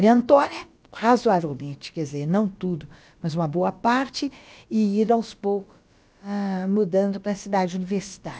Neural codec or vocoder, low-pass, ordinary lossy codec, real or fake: codec, 16 kHz, about 1 kbps, DyCAST, with the encoder's durations; none; none; fake